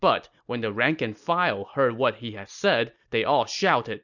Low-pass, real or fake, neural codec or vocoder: 7.2 kHz; real; none